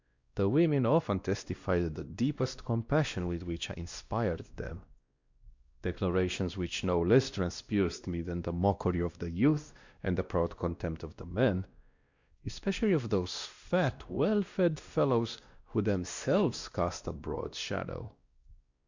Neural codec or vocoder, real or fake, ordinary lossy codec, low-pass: codec, 16 kHz, 1 kbps, X-Codec, WavLM features, trained on Multilingual LibriSpeech; fake; Opus, 64 kbps; 7.2 kHz